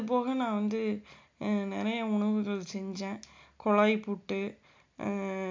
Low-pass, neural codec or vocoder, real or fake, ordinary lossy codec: 7.2 kHz; none; real; MP3, 64 kbps